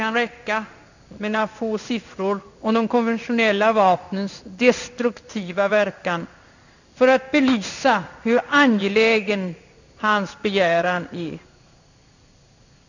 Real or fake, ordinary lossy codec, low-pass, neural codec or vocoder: fake; none; 7.2 kHz; codec, 16 kHz in and 24 kHz out, 1 kbps, XY-Tokenizer